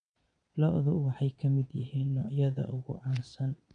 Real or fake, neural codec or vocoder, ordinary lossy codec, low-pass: fake; vocoder, 22.05 kHz, 80 mel bands, Vocos; none; 9.9 kHz